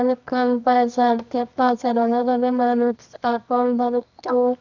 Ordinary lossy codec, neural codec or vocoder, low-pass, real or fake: none; codec, 24 kHz, 0.9 kbps, WavTokenizer, medium music audio release; 7.2 kHz; fake